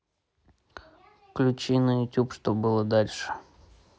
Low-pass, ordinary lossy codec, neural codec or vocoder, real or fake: none; none; none; real